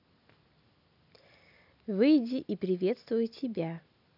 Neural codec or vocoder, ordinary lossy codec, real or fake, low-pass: none; none; real; 5.4 kHz